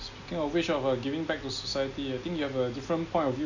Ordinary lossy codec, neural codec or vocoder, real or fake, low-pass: none; none; real; 7.2 kHz